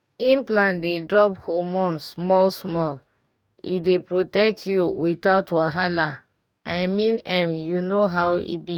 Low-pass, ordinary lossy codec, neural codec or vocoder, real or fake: 19.8 kHz; none; codec, 44.1 kHz, 2.6 kbps, DAC; fake